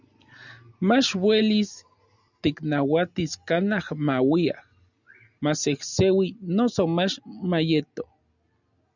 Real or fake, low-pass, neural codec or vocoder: real; 7.2 kHz; none